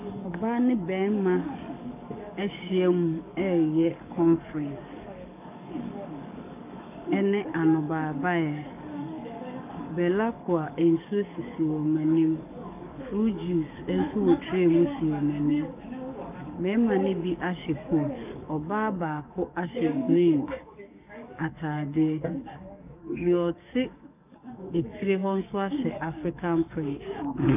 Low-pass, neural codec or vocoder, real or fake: 3.6 kHz; codec, 44.1 kHz, 7.8 kbps, DAC; fake